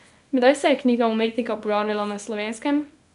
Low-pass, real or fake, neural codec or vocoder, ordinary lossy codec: 10.8 kHz; fake; codec, 24 kHz, 0.9 kbps, WavTokenizer, small release; none